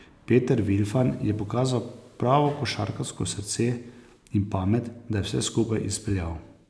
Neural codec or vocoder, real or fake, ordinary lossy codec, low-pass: none; real; none; none